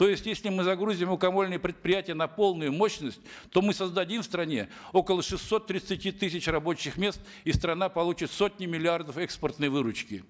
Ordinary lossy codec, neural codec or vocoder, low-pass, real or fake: none; none; none; real